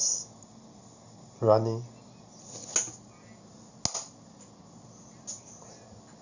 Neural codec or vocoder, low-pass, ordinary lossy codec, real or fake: none; 7.2 kHz; Opus, 64 kbps; real